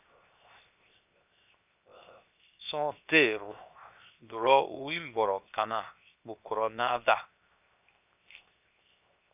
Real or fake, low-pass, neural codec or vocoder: fake; 3.6 kHz; codec, 16 kHz, 0.7 kbps, FocalCodec